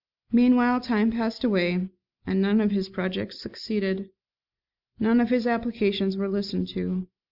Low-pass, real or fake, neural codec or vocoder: 5.4 kHz; real; none